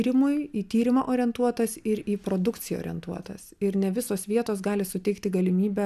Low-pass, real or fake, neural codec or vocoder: 14.4 kHz; real; none